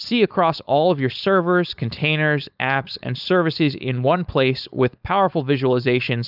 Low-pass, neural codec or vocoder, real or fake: 5.4 kHz; codec, 16 kHz, 4.8 kbps, FACodec; fake